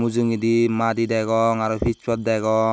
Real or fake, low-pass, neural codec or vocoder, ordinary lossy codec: real; none; none; none